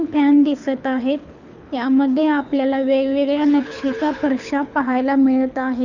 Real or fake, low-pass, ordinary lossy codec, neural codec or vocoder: fake; 7.2 kHz; none; codec, 24 kHz, 6 kbps, HILCodec